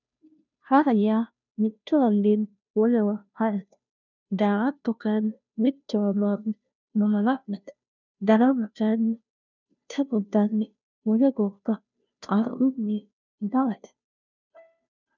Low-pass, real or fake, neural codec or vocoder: 7.2 kHz; fake; codec, 16 kHz, 0.5 kbps, FunCodec, trained on Chinese and English, 25 frames a second